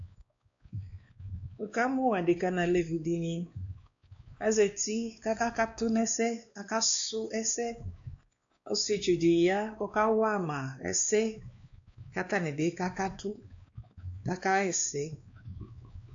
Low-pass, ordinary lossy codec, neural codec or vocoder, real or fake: 7.2 kHz; AAC, 64 kbps; codec, 16 kHz, 2 kbps, X-Codec, WavLM features, trained on Multilingual LibriSpeech; fake